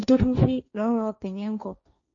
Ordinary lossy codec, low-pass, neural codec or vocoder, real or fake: none; 7.2 kHz; codec, 16 kHz, 1.1 kbps, Voila-Tokenizer; fake